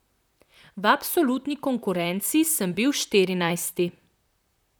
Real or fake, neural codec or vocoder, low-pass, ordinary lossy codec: fake; vocoder, 44.1 kHz, 128 mel bands, Pupu-Vocoder; none; none